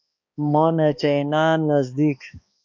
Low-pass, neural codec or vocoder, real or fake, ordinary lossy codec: 7.2 kHz; codec, 16 kHz, 2 kbps, X-Codec, HuBERT features, trained on balanced general audio; fake; MP3, 48 kbps